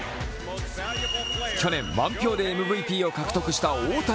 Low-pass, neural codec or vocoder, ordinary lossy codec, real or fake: none; none; none; real